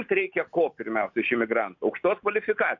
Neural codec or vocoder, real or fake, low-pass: none; real; 7.2 kHz